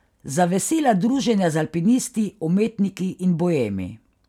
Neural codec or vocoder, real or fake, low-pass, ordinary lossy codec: none; real; 19.8 kHz; none